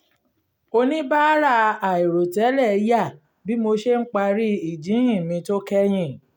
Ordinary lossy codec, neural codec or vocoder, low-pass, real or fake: none; none; 19.8 kHz; real